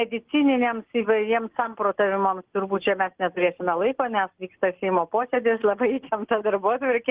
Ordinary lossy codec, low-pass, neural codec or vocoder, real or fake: Opus, 16 kbps; 3.6 kHz; none; real